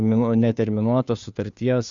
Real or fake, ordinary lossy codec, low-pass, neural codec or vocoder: fake; Opus, 64 kbps; 7.2 kHz; codec, 16 kHz, 2 kbps, FunCodec, trained on Chinese and English, 25 frames a second